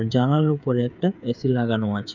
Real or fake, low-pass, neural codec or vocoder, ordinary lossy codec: fake; 7.2 kHz; codec, 16 kHz, 8 kbps, FreqCodec, smaller model; none